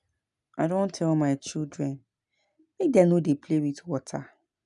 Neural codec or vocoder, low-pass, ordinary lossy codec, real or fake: none; 10.8 kHz; none; real